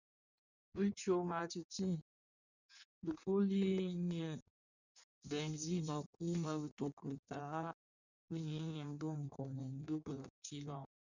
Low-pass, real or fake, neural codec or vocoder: 7.2 kHz; fake; codec, 16 kHz in and 24 kHz out, 1.1 kbps, FireRedTTS-2 codec